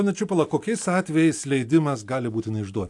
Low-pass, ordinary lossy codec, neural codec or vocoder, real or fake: 10.8 kHz; AAC, 64 kbps; none; real